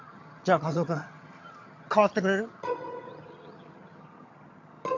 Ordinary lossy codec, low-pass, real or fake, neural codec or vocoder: AAC, 48 kbps; 7.2 kHz; fake; vocoder, 22.05 kHz, 80 mel bands, HiFi-GAN